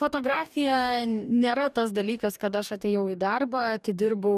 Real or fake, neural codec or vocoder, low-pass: fake; codec, 44.1 kHz, 2.6 kbps, DAC; 14.4 kHz